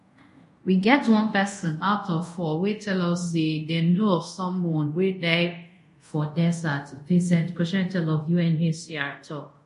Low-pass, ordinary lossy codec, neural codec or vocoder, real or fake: 10.8 kHz; MP3, 48 kbps; codec, 24 kHz, 0.5 kbps, DualCodec; fake